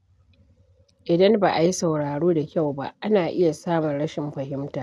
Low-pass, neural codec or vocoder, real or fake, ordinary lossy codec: none; none; real; none